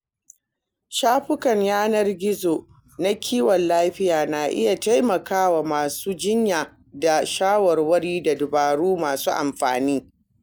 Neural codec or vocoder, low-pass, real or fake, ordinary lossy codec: none; none; real; none